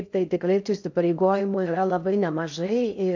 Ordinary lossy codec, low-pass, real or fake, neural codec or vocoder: AAC, 48 kbps; 7.2 kHz; fake; codec, 16 kHz in and 24 kHz out, 0.6 kbps, FocalCodec, streaming, 4096 codes